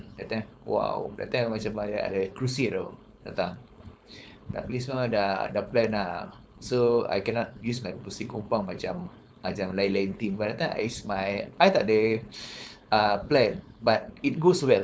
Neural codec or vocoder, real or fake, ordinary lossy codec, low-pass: codec, 16 kHz, 4.8 kbps, FACodec; fake; none; none